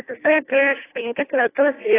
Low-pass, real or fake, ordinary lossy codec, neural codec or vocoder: 3.6 kHz; fake; AAC, 16 kbps; codec, 24 kHz, 1.5 kbps, HILCodec